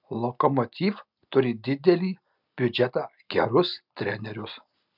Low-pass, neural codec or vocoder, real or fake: 5.4 kHz; none; real